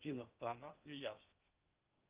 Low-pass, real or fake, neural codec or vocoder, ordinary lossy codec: 3.6 kHz; fake; codec, 16 kHz in and 24 kHz out, 0.6 kbps, FocalCodec, streaming, 2048 codes; Opus, 24 kbps